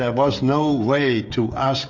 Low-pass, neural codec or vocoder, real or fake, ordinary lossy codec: 7.2 kHz; codec, 16 kHz, 16 kbps, FreqCodec, smaller model; fake; Opus, 64 kbps